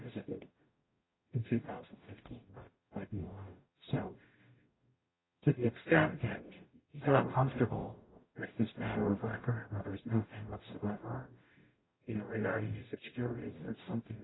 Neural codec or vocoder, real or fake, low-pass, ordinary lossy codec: codec, 44.1 kHz, 0.9 kbps, DAC; fake; 7.2 kHz; AAC, 16 kbps